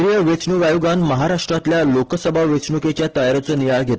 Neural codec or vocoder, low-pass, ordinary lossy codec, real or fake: none; 7.2 kHz; Opus, 16 kbps; real